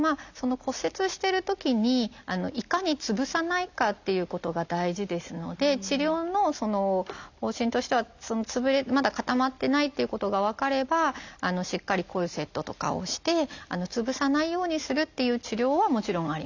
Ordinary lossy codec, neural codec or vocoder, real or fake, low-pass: none; none; real; 7.2 kHz